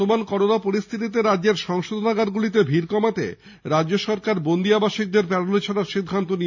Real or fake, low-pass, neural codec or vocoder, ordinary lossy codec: real; 7.2 kHz; none; none